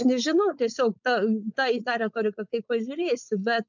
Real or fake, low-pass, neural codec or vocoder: fake; 7.2 kHz; codec, 16 kHz, 4.8 kbps, FACodec